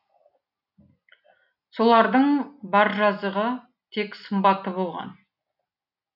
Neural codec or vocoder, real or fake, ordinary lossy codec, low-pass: none; real; none; 5.4 kHz